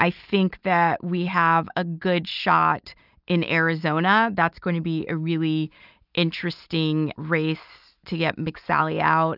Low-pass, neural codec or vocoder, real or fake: 5.4 kHz; none; real